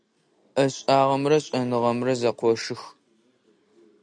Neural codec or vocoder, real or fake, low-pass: none; real; 9.9 kHz